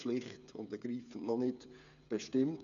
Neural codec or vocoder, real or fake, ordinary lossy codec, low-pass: codec, 16 kHz, 8 kbps, FreqCodec, smaller model; fake; none; 7.2 kHz